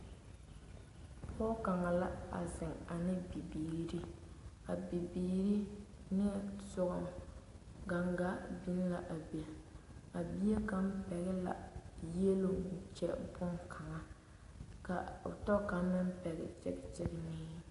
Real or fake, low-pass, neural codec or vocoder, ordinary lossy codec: real; 10.8 kHz; none; AAC, 48 kbps